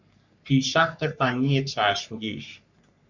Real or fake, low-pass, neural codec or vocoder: fake; 7.2 kHz; codec, 44.1 kHz, 3.4 kbps, Pupu-Codec